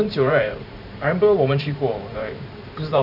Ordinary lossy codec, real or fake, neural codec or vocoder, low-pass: none; fake; vocoder, 44.1 kHz, 128 mel bands every 512 samples, BigVGAN v2; 5.4 kHz